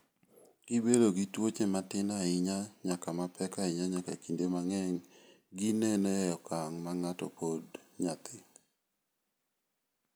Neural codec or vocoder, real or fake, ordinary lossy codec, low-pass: none; real; none; none